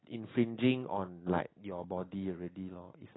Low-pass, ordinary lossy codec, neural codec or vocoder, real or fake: 7.2 kHz; AAC, 16 kbps; none; real